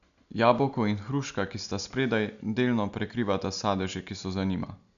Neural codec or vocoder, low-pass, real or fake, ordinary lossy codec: none; 7.2 kHz; real; none